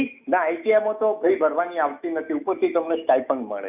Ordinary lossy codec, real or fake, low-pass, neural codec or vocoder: none; fake; 3.6 kHz; autoencoder, 48 kHz, 128 numbers a frame, DAC-VAE, trained on Japanese speech